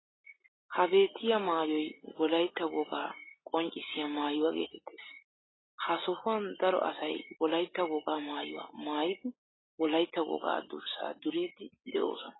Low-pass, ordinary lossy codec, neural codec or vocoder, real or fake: 7.2 kHz; AAC, 16 kbps; none; real